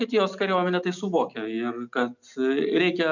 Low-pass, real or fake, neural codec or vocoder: 7.2 kHz; real; none